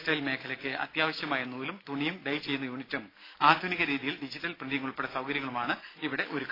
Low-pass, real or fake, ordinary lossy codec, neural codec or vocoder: 5.4 kHz; real; AAC, 24 kbps; none